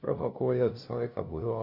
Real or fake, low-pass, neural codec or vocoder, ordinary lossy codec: fake; 5.4 kHz; codec, 16 kHz, 0.5 kbps, FunCodec, trained on LibriTTS, 25 frames a second; Opus, 64 kbps